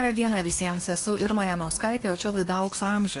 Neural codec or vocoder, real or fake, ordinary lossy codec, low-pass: codec, 24 kHz, 1 kbps, SNAC; fake; AAC, 48 kbps; 10.8 kHz